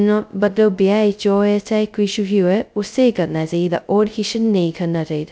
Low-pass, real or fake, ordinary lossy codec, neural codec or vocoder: none; fake; none; codec, 16 kHz, 0.2 kbps, FocalCodec